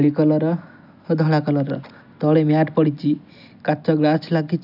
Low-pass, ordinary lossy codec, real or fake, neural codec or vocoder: 5.4 kHz; none; real; none